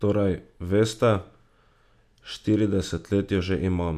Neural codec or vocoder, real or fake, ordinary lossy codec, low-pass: none; real; none; 14.4 kHz